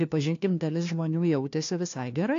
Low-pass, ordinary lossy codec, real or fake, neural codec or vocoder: 7.2 kHz; AAC, 48 kbps; fake; codec, 16 kHz, 1 kbps, FunCodec, trained on LibriTTS, 50 frames a second